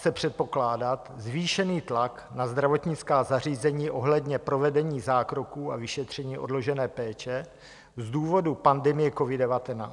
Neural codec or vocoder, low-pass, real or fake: none; 10.8 kHz; real